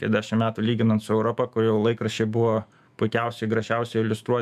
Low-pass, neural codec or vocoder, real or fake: 14.4 kHz; autoencoder, 48 kHz, 128 numbers a frame, DAC-VAE, trained on Japanese speech; fake